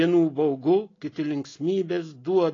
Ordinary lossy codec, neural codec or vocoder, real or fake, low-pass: AAC, 32 kbps; none; real; 7.2 kHz